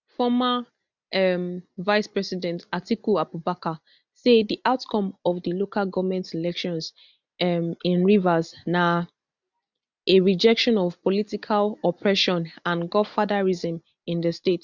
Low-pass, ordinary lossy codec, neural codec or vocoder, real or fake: 7.2 kHz; none; none; real